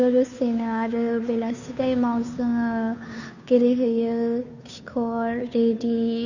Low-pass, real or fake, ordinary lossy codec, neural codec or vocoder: 7.2 kHz; fake; none; codec, 16 kHz, 2 kbps, FunCodec, trained on Chinese and English, 25 frames a second